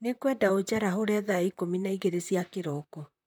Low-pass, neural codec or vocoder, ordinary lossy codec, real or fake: none; vocoder, 44.1 kHz, 128 mel bands, Pupu-Vocoder; none; fake